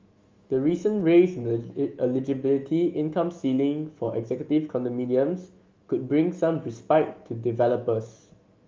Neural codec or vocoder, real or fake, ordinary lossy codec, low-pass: autoencoder, 48 kHz, 128 numbers a frame, DAC-VAE, trained on Japanese speech; fake; Opus, 32 kbps; 7.2 kHz